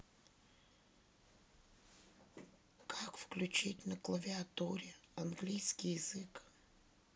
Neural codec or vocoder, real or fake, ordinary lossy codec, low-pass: none; real; none; none